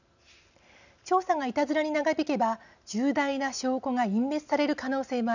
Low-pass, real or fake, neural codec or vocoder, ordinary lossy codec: 7.2 kHz; real; none; none